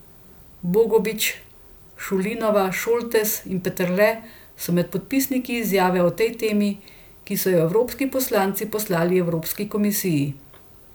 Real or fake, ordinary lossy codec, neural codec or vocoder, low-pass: real; none; none; none